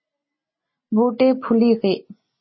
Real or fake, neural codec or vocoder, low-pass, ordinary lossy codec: real; none; 7.2 kHz; MP3, 24 kbps